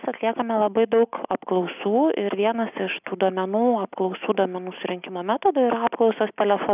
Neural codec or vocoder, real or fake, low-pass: none; real; 3.6 kHz